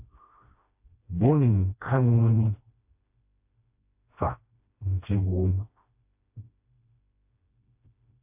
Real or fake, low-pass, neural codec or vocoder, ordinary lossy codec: fake; 3.6 kHz; codec, 16 kHz, 1 kbps, FreqCodec, smaller model; AAC, 32 kbps